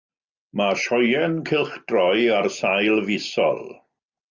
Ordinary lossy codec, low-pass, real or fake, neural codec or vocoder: Opus, 64 kbps; 7.2 kHz; real; none